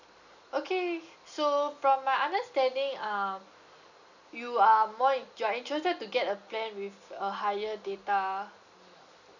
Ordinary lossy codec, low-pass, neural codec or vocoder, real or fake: none; 7.2 kHz; none; real